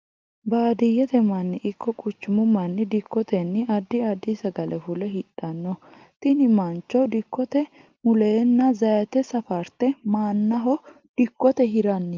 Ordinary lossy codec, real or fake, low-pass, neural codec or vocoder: Opus, 32 kbps; real; 7.2 kHz; none